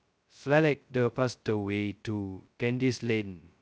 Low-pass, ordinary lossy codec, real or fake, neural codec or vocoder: none; none; fake; codec, 16 kHz, 0.2 kbps, FocalCodec